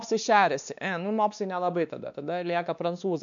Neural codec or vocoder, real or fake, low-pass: codec, 16 kHz, 2 kbps, X-Codec, WavLM features, trained on Multilingual LibriSpeech; fake; 7.2 kHz